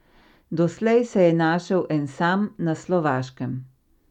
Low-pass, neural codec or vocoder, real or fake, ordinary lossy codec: 19.8 kHz; none; real; none